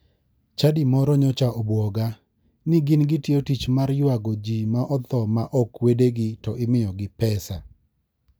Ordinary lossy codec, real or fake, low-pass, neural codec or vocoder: none; real; none; none